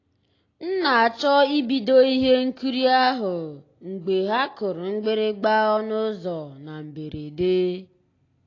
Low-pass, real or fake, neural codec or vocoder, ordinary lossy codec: 7.2 kHz; real; none; AAC, 32 kbps